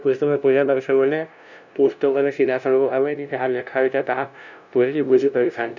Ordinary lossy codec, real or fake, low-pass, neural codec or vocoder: none; fake; 7.2 kHz; codec, 16 kHz, 0.5 kbps, FunCodec, trained on LibriTTS, 25 frames a second